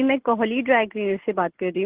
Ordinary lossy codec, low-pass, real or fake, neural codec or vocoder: Opus, 16 kbps; 3.6 kHz; real; none